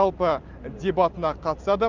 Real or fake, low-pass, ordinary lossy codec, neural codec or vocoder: fake; 7.2 kHz; Opus, 24 kbps; vocoder, 44.1 kHz, 128 mel bands every 512 samples, BigVGAN v2